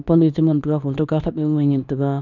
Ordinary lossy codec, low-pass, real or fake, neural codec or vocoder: none; 7.2 kHz; fake; codec, 24 kHz, 0.9 kbps, WavTokenizer, medium speech release version 2